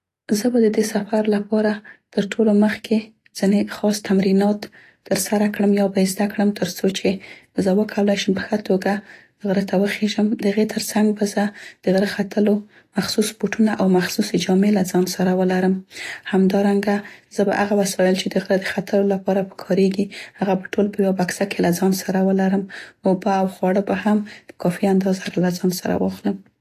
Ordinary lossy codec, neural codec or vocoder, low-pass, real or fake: AAC, 48 kbps; none; 14.4 kHz; real